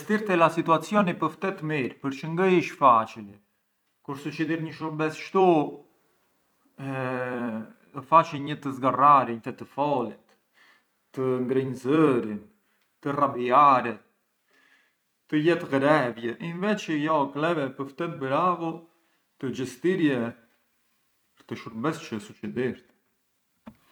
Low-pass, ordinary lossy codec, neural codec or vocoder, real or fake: 19.8 kHz; none; vocoder, 44.1 kHz, 128 mel bands every 256 samples, BigVGAN v2; fake